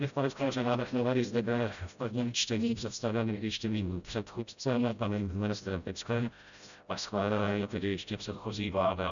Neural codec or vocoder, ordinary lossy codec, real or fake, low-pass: codec, 16 kHz, 0.5 kbps, FreqCodec, smaller model; MP3, 96 kbps; fake; 7.2 kHz